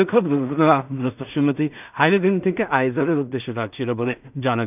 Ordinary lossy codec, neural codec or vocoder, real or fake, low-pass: none; codec, 16 kHz in and 24 kHz out, 0.4 kbps, LongCat-Audio-Codec, two codebook decoder; fake; 3.6 kHz